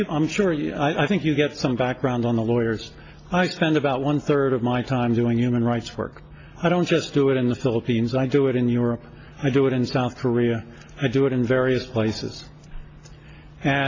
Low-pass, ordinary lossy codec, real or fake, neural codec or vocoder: 7.2 kHz; AAC, 32 kbps; real; none